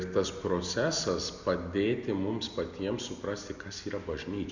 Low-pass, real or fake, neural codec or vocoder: 7.2 kHz; real; none